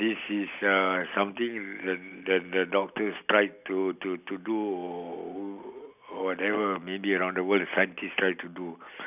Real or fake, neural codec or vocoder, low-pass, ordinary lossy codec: real; none; 3.6 kHz; none